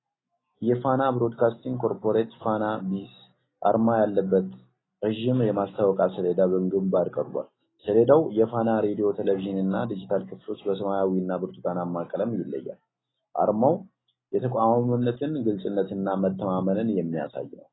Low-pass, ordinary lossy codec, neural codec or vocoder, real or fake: 7.2 kHz; AAC, 16 kbps; none; real